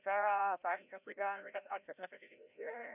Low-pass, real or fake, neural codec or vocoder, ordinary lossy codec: 3.6 kHz; fake; codec, 16 kHz, 0.5 kbps, FreqCodec, larger model; none